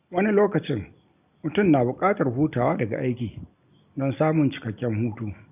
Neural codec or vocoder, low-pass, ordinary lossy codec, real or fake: none; 3.6 kHz; none; real